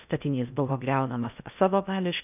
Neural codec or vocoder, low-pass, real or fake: codec, 16 kHz in and 24 kHz out, 0.6 kbps, FocalCodec, streaming, 4096 codes; 3.6 kHz; fake